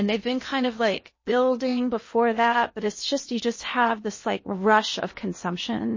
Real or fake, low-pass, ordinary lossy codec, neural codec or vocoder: fake; 7.2 kHz; MP3, 32 kbps; codec, 16 kHz in and 24 kHz out, 0.8 kbps, FocalCodec, streaming, 65536 codes